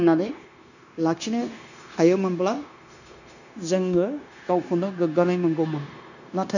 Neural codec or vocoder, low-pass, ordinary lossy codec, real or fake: codec, 16 kHz, 0.9 kbps, LongCat-Audio-Codec; 7.2 kHz; none; fake